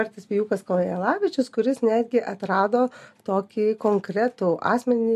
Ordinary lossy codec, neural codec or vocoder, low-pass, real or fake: MP3, 64 kbps; none; 14.4 kHz; real